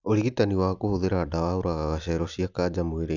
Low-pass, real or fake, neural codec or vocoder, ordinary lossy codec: 7.2 kHz; real; none; none